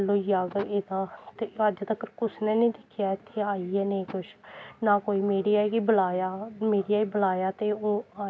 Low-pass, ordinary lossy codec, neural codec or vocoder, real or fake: none; none; none; real